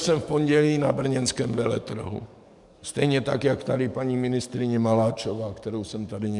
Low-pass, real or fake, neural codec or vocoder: 10.8 kHz; fake; codec, 44.1 kHz, 7.8 kbps, DAC